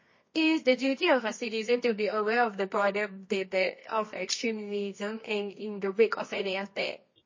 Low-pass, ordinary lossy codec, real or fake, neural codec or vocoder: 7.2 kHz; MP3, 32 kbps; fake; codec, 24 kHz, 0.9 kbps, WavTokenizer, medium music audio release